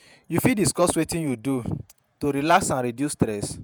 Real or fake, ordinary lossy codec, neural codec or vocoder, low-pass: fake; none; vocoder, 48 kHz, 128 mel bands, Vocos; none